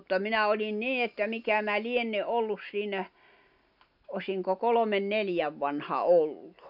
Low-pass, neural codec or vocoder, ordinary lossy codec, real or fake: 5.4 kHz; none; none; real